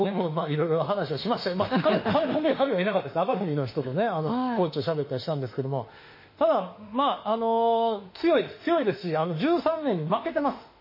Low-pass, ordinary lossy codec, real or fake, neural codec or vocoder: 5.4 kHz; MP3, 24 kbps; fake; autoencoder, 48 kHz, 32 numbers a frame, DAC-VAE, trained on Japanese speech